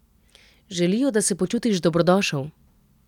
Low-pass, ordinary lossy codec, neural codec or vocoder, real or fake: 19.8 kHz; none; none; real